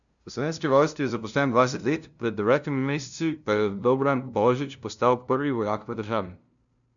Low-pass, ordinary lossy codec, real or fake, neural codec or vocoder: 7.2 kHz; none; fake; codec, 16 kHz, 0.5 kbps, FunCodec, trained on LibriTTS, 25 frames a second